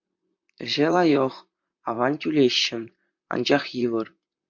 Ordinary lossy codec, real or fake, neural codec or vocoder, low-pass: MP3, 64 kbps; fake; vocoder, 44.1 kHz, 128 mel bands, Pupu-Vocoder; 7.2 kHz